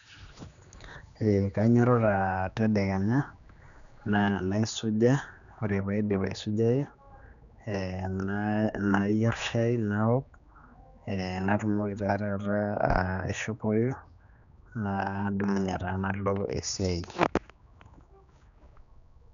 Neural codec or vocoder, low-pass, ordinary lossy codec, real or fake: codec, 16 kHz, 2 kbps, X-Codec, HuBERT features, trained on general audio; 7.2 kHz; none; fake